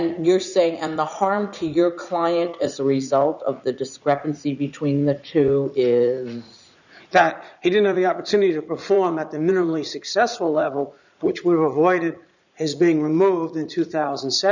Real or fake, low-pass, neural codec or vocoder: fake; 7.2 kHz; vocoder, 44.1 kHz, 80 mel bands, Vocos